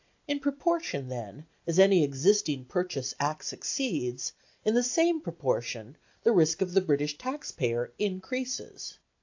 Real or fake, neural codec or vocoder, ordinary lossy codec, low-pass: real; none; AAC, 48 kbps; 7.2 kHz